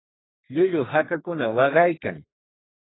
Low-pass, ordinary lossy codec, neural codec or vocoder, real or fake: 7.2 kHz; AAC, 16 kbps; codec, 32 kHz, 1.9 kbps, SNAC; fake